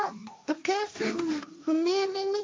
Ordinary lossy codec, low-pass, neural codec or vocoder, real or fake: none; none; codec, 16 kHz, 1.1 kbps, Voila-Tokenizer; fake